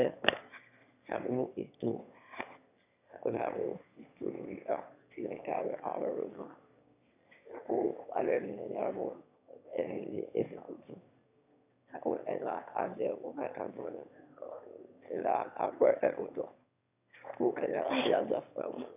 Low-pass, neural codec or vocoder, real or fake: 3.6 kHz; autoencoder, 22.05 kHz, a latent of 192 numbers a frame, VITS, trained on one speaker; fake